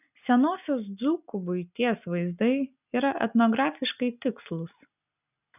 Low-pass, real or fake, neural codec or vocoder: 3.6 kHz; real; none